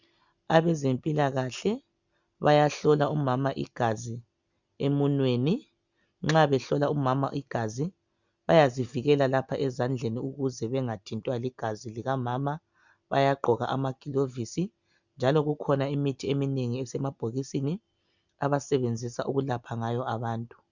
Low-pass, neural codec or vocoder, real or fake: 7.2 kHz; none; real